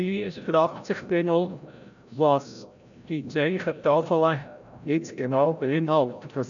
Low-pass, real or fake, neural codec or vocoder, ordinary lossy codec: 7.2 kHz; fake; codec, 16 kHz, 0.5 kbps, FreqCodec, larger model; none